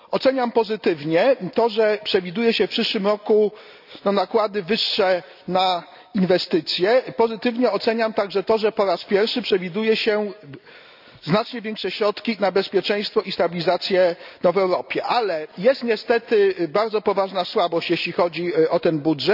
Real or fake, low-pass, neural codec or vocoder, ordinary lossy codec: real; 5.4 kHz; none; none